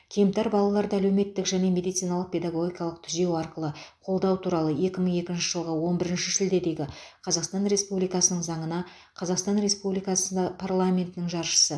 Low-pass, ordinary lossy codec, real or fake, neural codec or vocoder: none; none; real; none